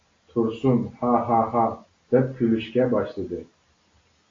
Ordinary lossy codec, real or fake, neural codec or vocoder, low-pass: AAC, 32 kbps; real; none; 7.2 kHz